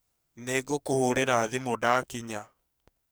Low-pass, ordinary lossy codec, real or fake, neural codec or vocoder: none; none; fake; codec, 44.1 kHz, 2.6 kbps, SNAC